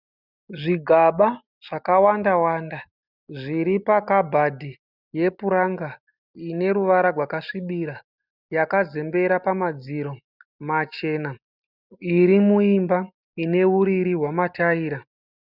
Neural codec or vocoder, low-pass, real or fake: none; 5.4 kHz; real